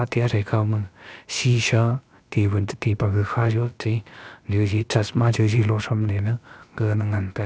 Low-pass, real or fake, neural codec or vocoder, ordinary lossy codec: none; fake; codec, 16 kHz, about 1 kbps, DyCAST, with the encoder's durations; none